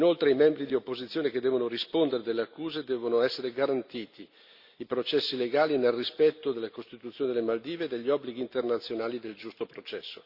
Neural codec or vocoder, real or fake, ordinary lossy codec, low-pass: none; real; Opus, 64 kbps; 5.4 kHz